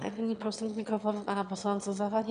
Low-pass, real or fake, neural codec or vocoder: 9.9 kHz; fake; autoencoder, 22.05 kHz, a latent of 192 numbers a frame, VITS, trained on one speaker